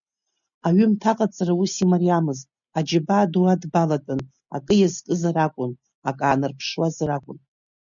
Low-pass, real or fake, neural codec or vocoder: 7.2 kHz; real; none